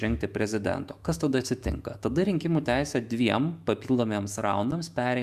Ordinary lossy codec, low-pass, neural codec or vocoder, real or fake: Opus, 64 kbps; 14.4 kHz; autoencoder, 48 kHz, 128 numbers a frame, DAC-VAE, trained on Japanese speech; fake